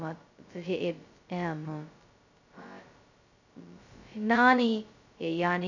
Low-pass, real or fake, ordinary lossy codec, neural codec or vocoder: 7.2 kHz; fake; none; codec, 16 kHz, 0.2 kbps, FocalCodec